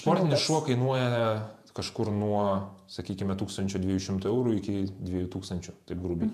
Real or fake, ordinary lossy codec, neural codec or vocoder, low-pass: fake; MP3, 96 kbps; vocoder, 48 kHz, 128 mel bands, Vocos; 14.4 kHz